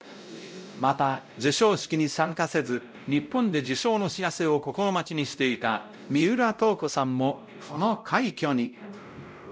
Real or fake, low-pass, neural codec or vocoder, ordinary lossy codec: fake; none; codec, 16 kHz, 0.5 kbps, X-Codec, WavLM features, trained on Multilingual LibriSpeech; none